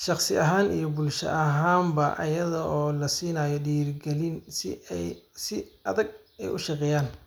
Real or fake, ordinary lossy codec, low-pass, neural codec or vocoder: real; none; none; none